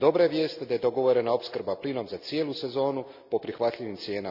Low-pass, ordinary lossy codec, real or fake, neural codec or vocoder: 5.4 kHz; none; real; none